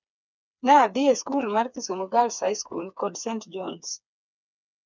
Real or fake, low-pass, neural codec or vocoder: fake; 7.2 kHz; codec, 16 kHz, 4 kbps, FreqCodec, smaller model